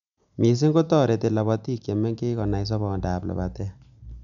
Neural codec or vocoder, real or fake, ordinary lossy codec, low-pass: none; real; none; 7.2 kHz